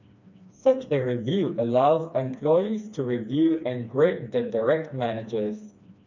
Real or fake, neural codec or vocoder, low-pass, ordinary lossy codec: fake; codec, 16 kHz, 2 kbps, FreqCodec, smaller model; 7.2 kHz; none